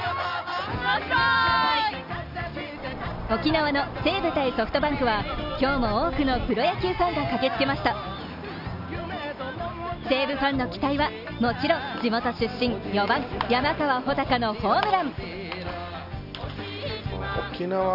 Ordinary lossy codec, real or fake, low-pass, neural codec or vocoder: none; real; 5.4 kHz; none